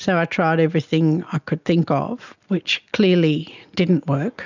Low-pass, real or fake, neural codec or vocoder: 7.2 kHz; real; none